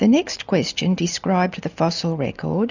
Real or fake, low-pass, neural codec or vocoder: real; 7.2 kHz; none